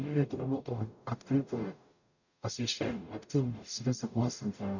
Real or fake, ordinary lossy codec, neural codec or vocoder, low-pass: fake; none; codec, 44.1 kHz, 0.9 kbps, DAC; 7.2 kHz